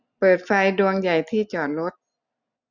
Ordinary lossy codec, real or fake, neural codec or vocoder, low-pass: none; real; none; 7.2 kHz